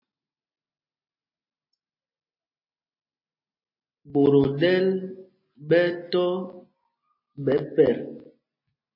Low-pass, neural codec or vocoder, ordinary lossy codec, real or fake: 5.4 kHz; none; MP3, 24 kbps; real